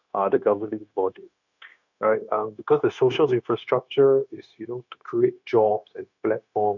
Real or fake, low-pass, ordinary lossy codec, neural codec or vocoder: fake; 7.2 kHz; none; codec, 16 kHz, 0.9 kbps, LongCat-Audio-Codec